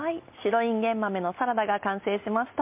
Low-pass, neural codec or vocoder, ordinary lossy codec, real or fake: 3.6 kHz; none; MP3, 32 kbps; real